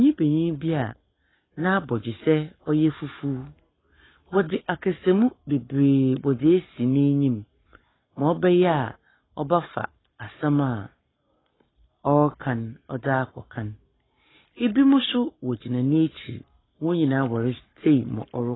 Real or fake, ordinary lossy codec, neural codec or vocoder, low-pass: fake; AAC, 16 kbps; codec, 44.1 kHz, 7.8 kbps, Pupu-Codec; 7.2 kHz